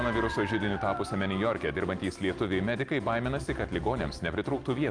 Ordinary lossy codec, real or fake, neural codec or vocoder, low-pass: Opus, 32 kbps; real; none; 9.9 kHz